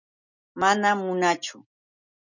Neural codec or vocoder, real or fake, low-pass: none; real; 7.2 kHz